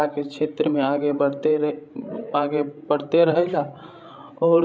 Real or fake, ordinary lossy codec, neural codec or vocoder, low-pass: fake; none; codec, 16 kHz, 16 kbps, FreqCodec, larger model; none